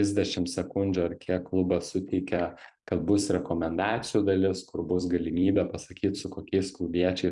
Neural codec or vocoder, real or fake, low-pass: vocoder, 44.1 kHz, 128 mel bands every 256 samples, BigVGAN v2; fake; 10.8 kHz